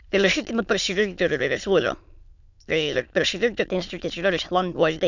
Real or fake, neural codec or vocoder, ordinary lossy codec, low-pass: fake; autoencoder, 22.05 kHz, a latent of 192 numbers a frame, VITS, trained on many speakers; none; 7.2 kHz